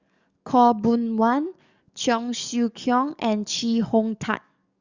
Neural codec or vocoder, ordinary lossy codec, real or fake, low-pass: codec, 44.1 kHz, 7.8 kbps, DAC; Opus, 64 kbps; fake; 7.2 kHz